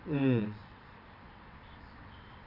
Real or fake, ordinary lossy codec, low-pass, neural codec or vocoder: fake; none; 5.4 kHz; vocoder, 22.05 kHz, 80 mel bands, WaveNeXt